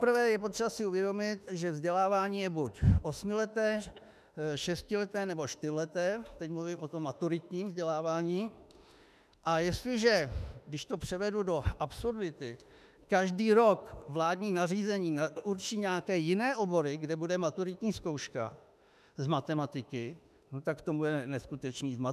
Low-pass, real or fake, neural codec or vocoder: 14.4 kHz; fake; autoencoder, 48 kHz, 32 numbers a frame, DAC-VAE, trained on Japanese speech